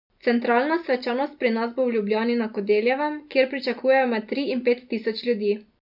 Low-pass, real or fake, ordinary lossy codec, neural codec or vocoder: 5.4 kHz; real; none; none